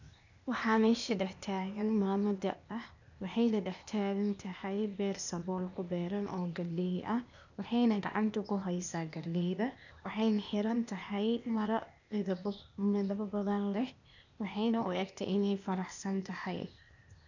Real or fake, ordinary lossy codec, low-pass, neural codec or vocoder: fake; none; 7.2 kHz; codec, 16 kHz, 0.8 kbps, ZipCodec